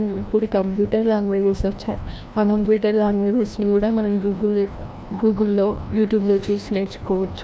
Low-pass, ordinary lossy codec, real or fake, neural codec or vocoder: none; none; fake; codec, 16 kHz, 1 kbps, FreqCodec, larger model